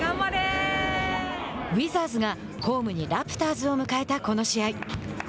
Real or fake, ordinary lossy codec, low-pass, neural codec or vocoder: real; none; none; none